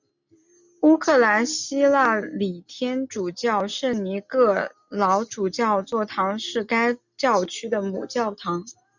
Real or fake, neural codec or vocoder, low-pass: real; none; 7.2 kHz